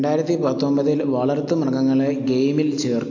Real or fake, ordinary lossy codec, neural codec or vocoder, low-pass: real; AAC, 48 kbps; none; 7.2 kHz